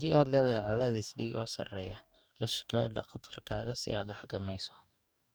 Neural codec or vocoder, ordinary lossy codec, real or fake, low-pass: codec, 44.1 kHz, 2.6 kbps, DAC; none; fake; none